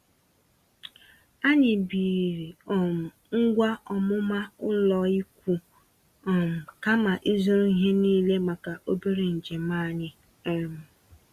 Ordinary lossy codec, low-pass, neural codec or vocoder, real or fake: Opus, 64 kbps; 14.4 kHz; none; real